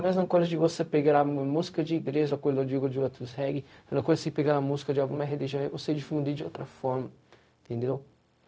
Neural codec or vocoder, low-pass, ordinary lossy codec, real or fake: codec, 16 kHz, 0.4 kbps, LongCat-Audio-Codec; none; none; fake